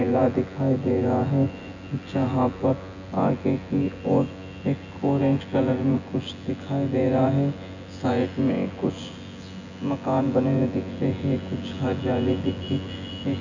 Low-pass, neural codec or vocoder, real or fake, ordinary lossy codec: 7.2 kHz; vocoder, 24 kHz, 100 mel bands, Vocos; fake; none